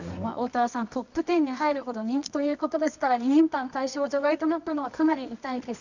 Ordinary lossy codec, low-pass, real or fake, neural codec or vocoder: none; 7.2 kHz; fake; codec, 24 kHz, 0.9 kbps, WavTokenizer, medium music audio release